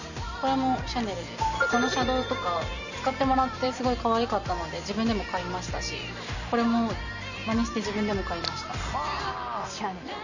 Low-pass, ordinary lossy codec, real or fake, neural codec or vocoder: 7.2 kHz; none; real; none